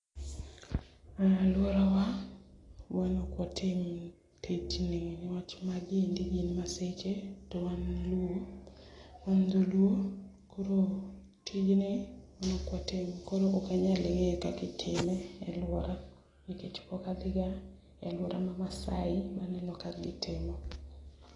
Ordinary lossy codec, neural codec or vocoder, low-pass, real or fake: AAC, 32 kbps; none; 10.8 kHz; real